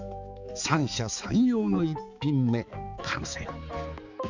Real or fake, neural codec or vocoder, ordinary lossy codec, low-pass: fake; codec, 16 kHz, 4 kbps, X-Codec, HuBERT features, trained on balanced general audio; none; 7.2 kHz